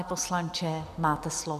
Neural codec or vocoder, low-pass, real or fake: none; 14.4 kHz; real